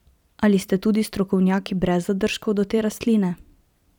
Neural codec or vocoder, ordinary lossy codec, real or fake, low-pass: vocoder, 44.1 kHz, 128 mel bands every 256 samples, BigVGAN v2; none; fake; 19.8 kHz